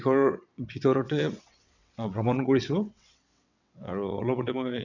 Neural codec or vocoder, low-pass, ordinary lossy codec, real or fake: vocoder, 22.05 kHz, 80 mel bands, WaveNeXt; 7.2 kHz; none; fake